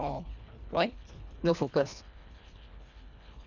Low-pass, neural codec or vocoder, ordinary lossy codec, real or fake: 7.2 kHz; codec, 24 kHz, 1.5 kbps, HILCodec; none; fake